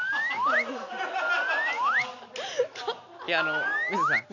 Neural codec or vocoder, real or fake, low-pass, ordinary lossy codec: none; real; 7.2 kHz; none